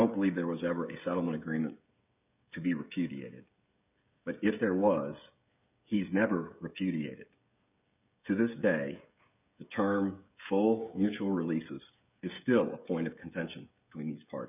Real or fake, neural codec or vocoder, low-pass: fake; codec, 16 kHz, 16 kbps, FreqCodec, smaller model; 3.6 kHz